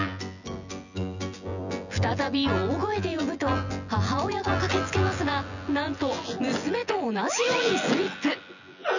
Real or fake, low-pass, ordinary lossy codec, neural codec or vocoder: fake; 7.2 kHz; none; vocoder, 24 kHz, 100 mel bands, Vocos